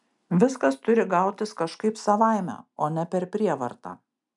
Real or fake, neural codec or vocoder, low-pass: real; none; 10.8 kHz